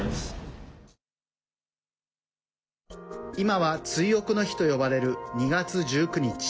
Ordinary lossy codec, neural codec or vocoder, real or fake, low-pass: none; none; real; none